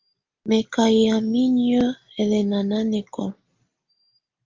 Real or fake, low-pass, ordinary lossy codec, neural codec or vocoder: real; 7.2 kHz; Opus, 32 kbps; none